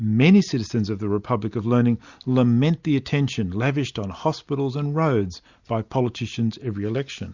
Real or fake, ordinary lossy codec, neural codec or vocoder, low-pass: real; Opus, 64 kbps; none; 7.2 kHz